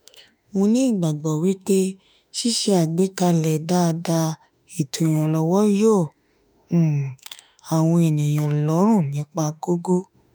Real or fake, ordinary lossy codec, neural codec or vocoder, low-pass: fake; none; autoencoder, 48 kHz, 32 numbers a frame, DAC-VAE, trained on Japanese speech; none